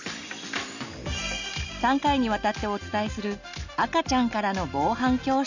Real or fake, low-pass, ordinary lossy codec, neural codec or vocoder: real; 7.2 kHz; none; none